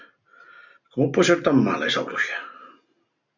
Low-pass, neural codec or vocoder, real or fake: 7.2 kHz; none; real